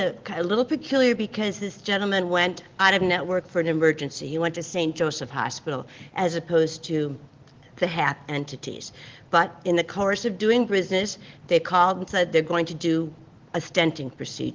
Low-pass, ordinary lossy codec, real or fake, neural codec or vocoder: 7.2 kHz; Opus, 16 kbps; real; none